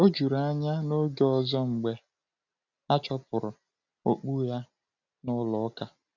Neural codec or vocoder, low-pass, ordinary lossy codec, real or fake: none; 7.2 kHz; none; real